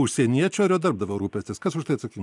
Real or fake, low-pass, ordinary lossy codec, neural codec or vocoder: real; 10.8 kHz; MP3, 96 kbps; none